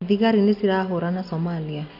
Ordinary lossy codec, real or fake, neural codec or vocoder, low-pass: none; real; none; 5.4 kHz